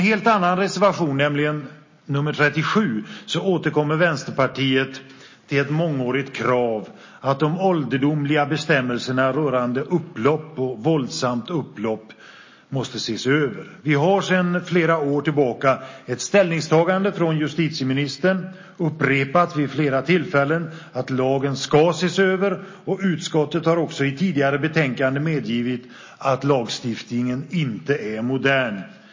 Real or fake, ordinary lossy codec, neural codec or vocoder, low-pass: real; MP3, 32 kbps; none; 7.2 kHz